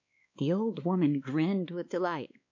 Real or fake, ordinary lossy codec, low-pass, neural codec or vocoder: fake; MP3, 64 kbps; 7.2 kHz; codec, 16 kHz, 2 kbps, X-Codec, WavLM features, trained on Multilingual LibriSpeech